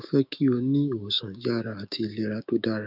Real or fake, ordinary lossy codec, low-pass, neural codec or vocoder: fake; none; 5.4 kHz; codec, 16 kHz, 6 kbps, DAC